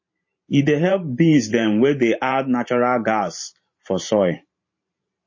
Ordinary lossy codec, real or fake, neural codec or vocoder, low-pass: MP3, 32 kbps; fake; vocoder, 44.1 kHz, 128 mel bands every 512 samples, BigVGAN v2; 7.2 kHz